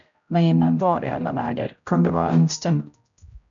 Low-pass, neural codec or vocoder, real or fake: 7.2 kHz; codec, 16 kHz, 0.5 kbps, X-Codec, HuBERT features, trained on general audio; fake